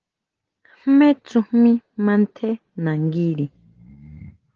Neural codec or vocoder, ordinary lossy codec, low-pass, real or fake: none; Opus, 16 kbps; 7.2 kHz; real